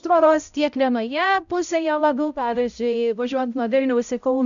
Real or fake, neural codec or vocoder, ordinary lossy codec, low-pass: fake; codec, 16 kHz, 0.5 kbps, X-Codec, HuBERT features, trained on balanced general audio; MP3, 96 kbps; 7.2 kHz